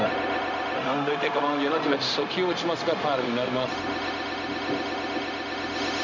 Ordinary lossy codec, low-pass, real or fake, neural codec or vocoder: none; 7.2 kHz; fake; codec, 16 kHz, 0.4 kbps, LongCat-Audio-Codec